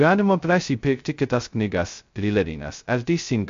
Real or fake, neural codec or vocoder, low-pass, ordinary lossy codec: fake; codec, 16 kHz, 0.2 kbps, FocalCodec; 7.2 kHz; MP3, 48 kbps